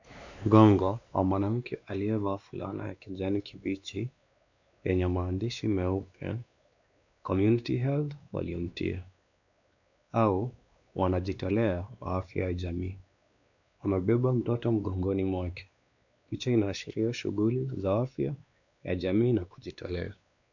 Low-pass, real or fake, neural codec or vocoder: 7.2 kHz; fake; codec, 16 kHz, 2 kbps, X-Codec, WavLM features, trained on Multilingual LibriSpeech